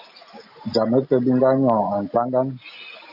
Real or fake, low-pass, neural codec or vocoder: real; 5.4 kHz; none